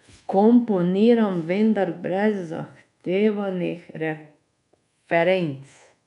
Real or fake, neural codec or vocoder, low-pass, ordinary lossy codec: fake; codec, 24 kHz, 1.2 kbps, DualCodec; 10.8 kHz; none